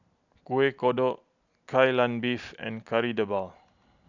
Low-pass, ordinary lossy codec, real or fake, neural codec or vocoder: 7.2 kHz; none; real; none